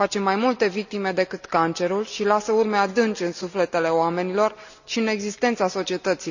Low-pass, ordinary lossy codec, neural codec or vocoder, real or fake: 7.2 kHz; none; none; real